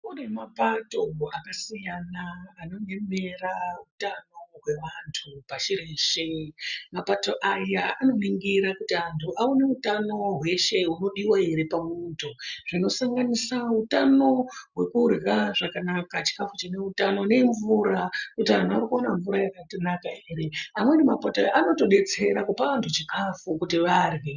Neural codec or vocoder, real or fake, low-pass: none; real; 7.2 kHz